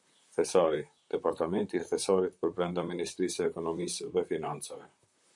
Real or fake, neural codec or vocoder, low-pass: fake; vocoder, 44.1 kHz, 128 mel bands, Pupu-Vocoder; 10.8 kHz